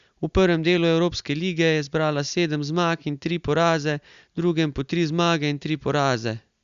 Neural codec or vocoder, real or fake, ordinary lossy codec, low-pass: none; real; Opus, 64 kbps; 7.2 kHz